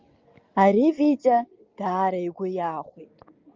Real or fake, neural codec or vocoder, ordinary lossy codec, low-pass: real; none; Opus, 32 kbps; 7.2 kHz